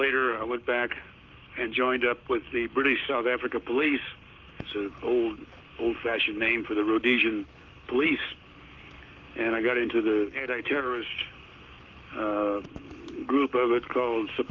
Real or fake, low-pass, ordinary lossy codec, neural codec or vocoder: fake; 7.2 kHz; Opus, 24 kbps; codec, 44.1 kHz, 7.8 kbps, Pupu-Codec